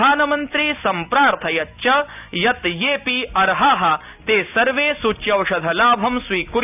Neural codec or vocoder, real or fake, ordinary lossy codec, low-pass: none; real; none; 3.6 kHz